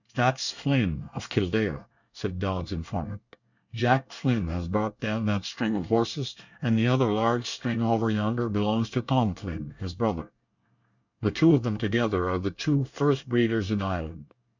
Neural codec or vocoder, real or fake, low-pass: codec, 24 kHz, 1 kbps, SNAC; fake; 7.2 kHz